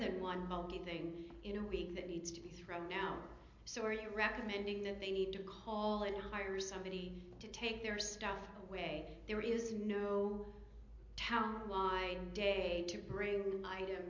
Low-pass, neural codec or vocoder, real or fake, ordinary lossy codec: 7.2 kHz; none; real; MP3, 48 kbps